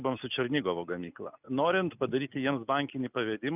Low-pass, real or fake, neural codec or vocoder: 3.6 kHz; real; none